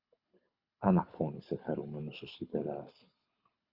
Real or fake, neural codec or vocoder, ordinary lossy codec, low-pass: fake; codec, 24 kHz, 6 kbps, HILCodec; Opus, 64 kbps; 5.4 kHz